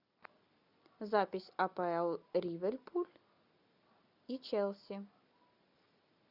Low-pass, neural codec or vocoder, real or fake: 5.4 kHz; none; real